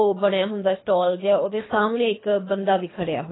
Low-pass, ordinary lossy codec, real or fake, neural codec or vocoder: 7.2 kHz; AAC, 16 kbps; fake; codec, 24 kHz, 3 kbps, HILCodec